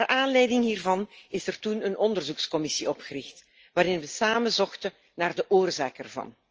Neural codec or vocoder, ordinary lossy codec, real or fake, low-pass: none; Opus, 24 kbps; real; 7.2 kHz